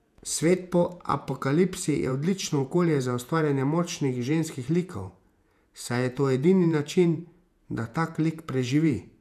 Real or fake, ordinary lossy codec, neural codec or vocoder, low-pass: fake; none; vocoder, 48 kHz, 128 mel bands, Vocos; 14.4 kHz